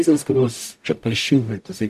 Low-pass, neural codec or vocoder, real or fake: 14.4 kHz; codec, 44.1 kHz, 0.9 kbps, DAC; fake